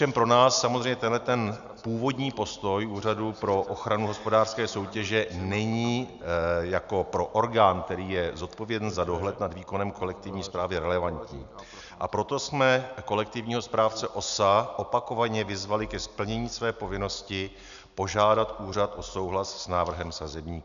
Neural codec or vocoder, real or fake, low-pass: none; real; 7.2 kHz